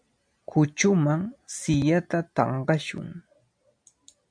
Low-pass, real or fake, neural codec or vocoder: 9.9 kHz; real; none